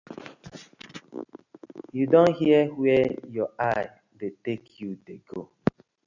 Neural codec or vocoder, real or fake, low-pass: none; real; 7.2 kHz